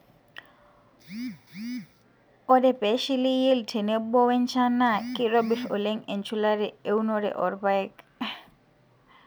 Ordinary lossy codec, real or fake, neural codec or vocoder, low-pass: none; real; none; none